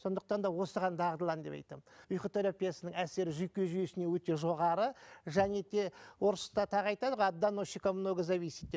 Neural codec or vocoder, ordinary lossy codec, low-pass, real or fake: none; none; none; real